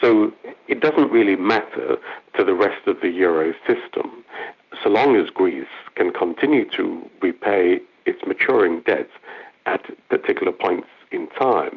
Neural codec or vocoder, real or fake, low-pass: none; real; 7.2 kHz